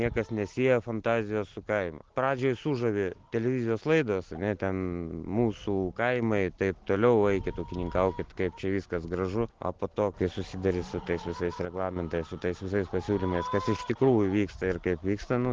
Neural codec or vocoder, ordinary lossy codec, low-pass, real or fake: none; Opus, 16 kbps; 7.2 kHz; real